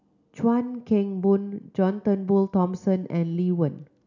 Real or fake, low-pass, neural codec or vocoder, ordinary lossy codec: real; 7.2 kHz; none; none